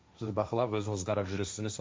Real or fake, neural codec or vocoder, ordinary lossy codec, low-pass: fake; codec, 16 kHz, 1.1 kbps, Voila-Tokenizer; none; none